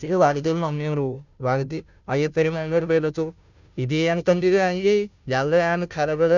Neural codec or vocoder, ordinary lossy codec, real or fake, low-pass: codec, 16 kHz, 0.5 kbps, FunCodec, trained on Chinese and English, 25 frames a second; none; fake; 7.2 kHz